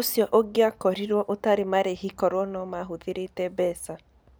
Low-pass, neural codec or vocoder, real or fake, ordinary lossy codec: none; none; real; none